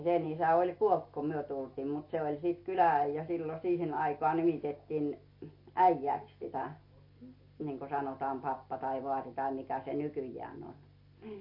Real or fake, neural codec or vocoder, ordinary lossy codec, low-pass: real; none; MP3, 32 kbps; 5.4 kHz